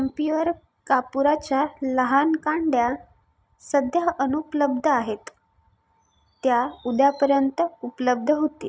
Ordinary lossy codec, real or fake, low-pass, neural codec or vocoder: none; real; none; none